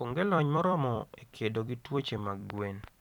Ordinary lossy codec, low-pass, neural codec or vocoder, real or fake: none; 19.8 kHz; vocoder, 44.1 kHz, 128 mel bands every 256 samples, BigVGAN v2; fake